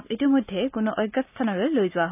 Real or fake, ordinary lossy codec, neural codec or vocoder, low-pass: real; none; none; 3.6 kHz